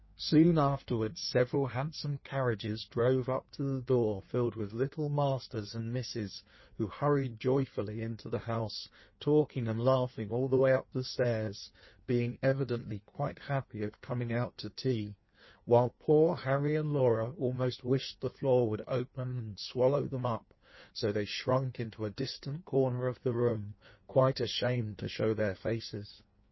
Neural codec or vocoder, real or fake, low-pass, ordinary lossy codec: codec, 16 kHz in and 24 kHz out, 1.1 kbps, FireRedTTS-2 codec; fake; 7.2 kHz; MP3, 24 kbps